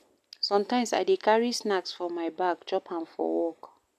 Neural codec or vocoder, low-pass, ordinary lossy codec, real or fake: none; 14.4 kHz; none; real